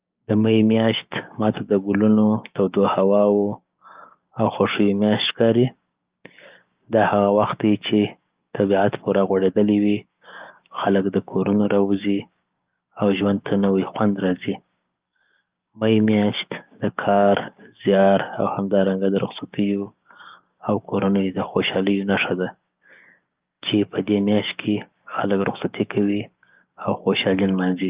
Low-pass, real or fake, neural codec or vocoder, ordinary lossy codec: 3.6 kHz; fake; codec, 16 kHz, 6 kbps, DAC; Opus, 24 kbps